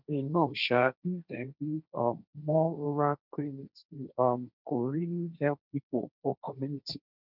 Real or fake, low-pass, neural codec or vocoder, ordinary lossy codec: fake; 5.4 kHz; codec, 16 kHz, 1.1 kbps, Voila-Tokenizer; none